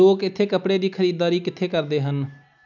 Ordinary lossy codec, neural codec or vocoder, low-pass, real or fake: none; none; 7.2 kHz; real